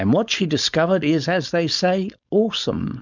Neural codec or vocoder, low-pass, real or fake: codec, 16 kHz, 4.8 kbps, FACodec; 7.2 kHz; fake